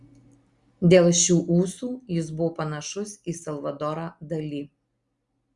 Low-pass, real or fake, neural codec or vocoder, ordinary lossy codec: 10.8 kHz; real; none; Opus, 64 kbps